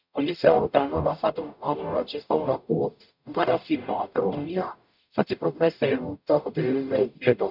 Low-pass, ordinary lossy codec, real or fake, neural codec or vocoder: 5.4 kHz; none; fake; codec, 44.1 kHz, 0.9 kbps, DAC